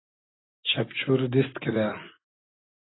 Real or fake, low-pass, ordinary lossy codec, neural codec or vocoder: fake; 7.2 kHz; AAC, 16 kbps; vocoder, 44.1 kHz, 128 mel bands every 256 samples, BigVGAN v2